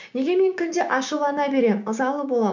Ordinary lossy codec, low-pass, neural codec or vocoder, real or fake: none; 7.2 kHz; codec, 16 kHz, 6 kbps, DAC; fake